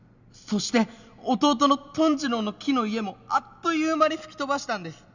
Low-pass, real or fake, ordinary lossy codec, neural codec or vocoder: 7.2 kHz; real; none; none